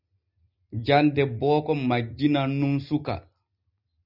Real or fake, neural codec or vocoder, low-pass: real; none; 5.4 kHz